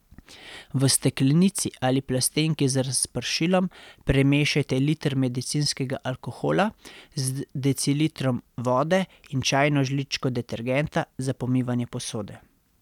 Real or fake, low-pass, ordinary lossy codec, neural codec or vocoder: real; 19.8 kHz; none; none